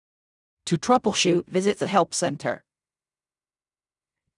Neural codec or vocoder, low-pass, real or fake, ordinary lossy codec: codec, 16 kHz in and 24 kHz out, 0.4 kbps, LongCat-Audio-Codec, fine tuned four codebook decoder; 10.8 kHz; fake; none